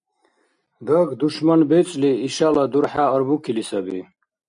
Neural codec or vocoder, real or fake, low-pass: none; real; 9.9 kHz